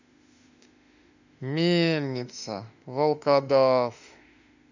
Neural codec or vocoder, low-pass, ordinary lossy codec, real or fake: autoencoder, 48 kHz, 32 numbers a frame, DAC-VAE, trained on Japanese speech; 7.2 kHz; none; fake